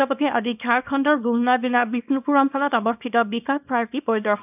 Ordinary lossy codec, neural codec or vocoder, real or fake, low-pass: none; codec, 24 kHz, 0.9 kbps, WavTokenizer, small release; fake; 3.6 kHz